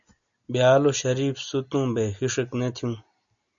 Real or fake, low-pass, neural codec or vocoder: real; 7.2 kHz; none